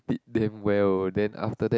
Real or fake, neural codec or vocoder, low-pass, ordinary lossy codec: real; none; none; none